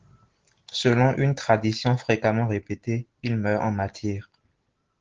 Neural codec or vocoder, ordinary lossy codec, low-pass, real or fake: none; Opus, 16 kbps; 7.2 kHz; real